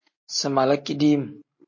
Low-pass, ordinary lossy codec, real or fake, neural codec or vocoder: 7.2 kHz; MP3, 32 kbps; real; none